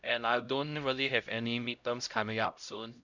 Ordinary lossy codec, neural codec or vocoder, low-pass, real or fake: none; codec, 16 kHz, 0.5 kbps, X-Codec, HuBERT features, trained on LibriSpeech; 7.2 kHz; fake